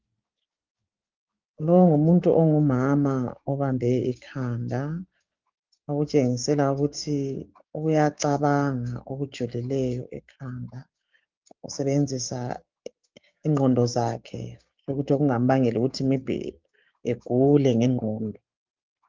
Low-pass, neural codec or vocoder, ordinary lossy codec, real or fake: 7.2 kHz; codec, 16 kHz, 6 kbps, DAC; Opus, 24 kbps; fake